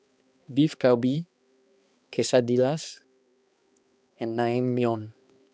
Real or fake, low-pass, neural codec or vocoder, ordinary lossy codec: fake; none; codec, 16 kHz, 2 kbps, X-Codec, HuBERT features, trained on balanced general audio; none